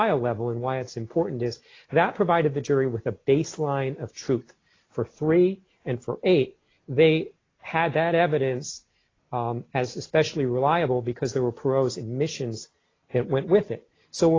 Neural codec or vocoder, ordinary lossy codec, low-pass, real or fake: none; AAC, 32 kbps; 7.2 kHz; real